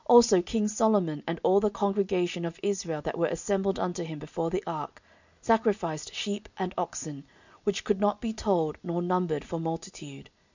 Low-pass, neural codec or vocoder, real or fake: 7.2 kHz; none; real